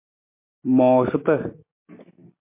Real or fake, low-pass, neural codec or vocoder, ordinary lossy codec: real; 3.6 kHz; none; MP3, 24 kbps